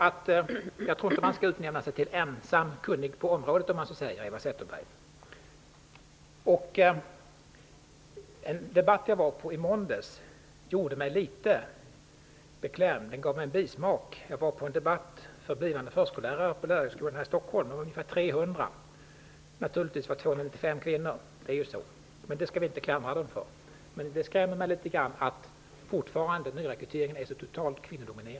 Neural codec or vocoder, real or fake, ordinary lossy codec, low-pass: none; real; none; none